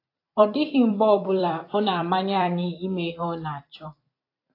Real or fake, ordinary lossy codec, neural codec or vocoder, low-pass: fake; AAC, 32 kbps; vocoder, 44.1 kHz, 128 mel bands every 256 samples, BigVGAN v2; 5.4 kHz